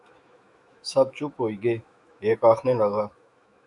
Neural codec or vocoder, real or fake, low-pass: autoencoder, 48 kHz, 128 numbers a frame, DAC-VAE, trained on Japanese speech; fake; 10.8 kHz